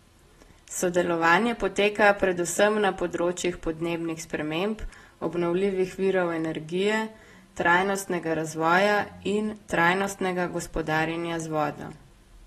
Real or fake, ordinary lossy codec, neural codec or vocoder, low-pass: real; AAC, 32 kbps; none; 19.8 kHz